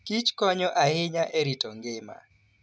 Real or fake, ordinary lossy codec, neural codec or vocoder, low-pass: real; none; none; none